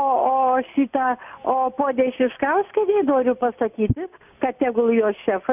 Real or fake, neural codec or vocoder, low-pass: real; none; 3.6 kHz